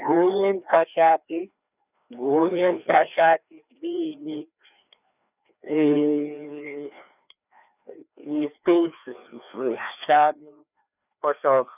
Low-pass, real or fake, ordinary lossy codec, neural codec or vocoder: 3.6 kHz; fake; none; codec, 16 kHz, 2 kbps, FreqCodec, larger model